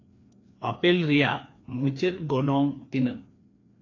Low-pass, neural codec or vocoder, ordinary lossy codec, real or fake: 7.2 kHz; codec, 16 kHz, 2 kbps, FreqCodec, larger model; AAC, 32 kbps; fake